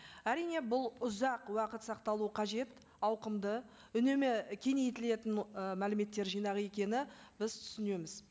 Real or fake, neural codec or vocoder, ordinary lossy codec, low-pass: real; none; none; none